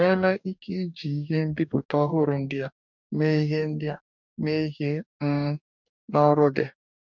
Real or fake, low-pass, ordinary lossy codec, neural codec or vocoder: fake; 7.2 kHz; none; codec, 44.1 kHz, 2.6 kbps, DAC